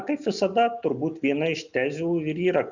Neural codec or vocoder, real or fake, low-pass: none; real; 7.2 kHz